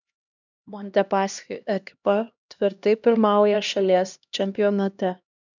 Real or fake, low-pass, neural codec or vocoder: fake; 7.2 kHz; codec, 16 kHz, 1 kbps, X-Codec, HuBERT features, trained on LibriSpeech